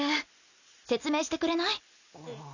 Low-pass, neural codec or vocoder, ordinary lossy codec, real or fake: 7.2 kHz; none; none; real